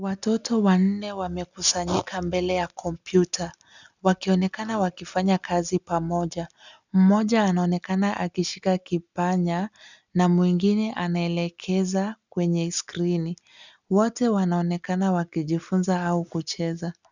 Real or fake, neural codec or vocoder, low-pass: real; none; 7.2 kHz